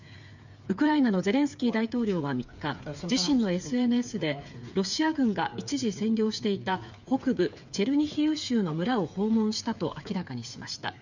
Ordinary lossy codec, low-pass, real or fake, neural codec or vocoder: none; 7.2 kHz; fake; codec, 16 kHz, 8 kbps, FreqCodec, smaller model